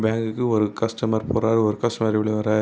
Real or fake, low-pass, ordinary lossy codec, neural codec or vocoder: real; none; none; none